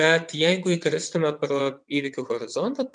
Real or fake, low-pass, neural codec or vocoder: fake; 9.9 kHz; vocoder, 22.05 kHz, 80 mel bands, WaveNeXt